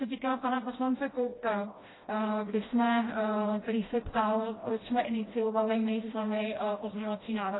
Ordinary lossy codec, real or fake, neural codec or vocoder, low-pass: AAC, 16 kbps; fake; codec, 16 kHz, 1 kbps, FreqCodec, smaller model; 7.2 kHz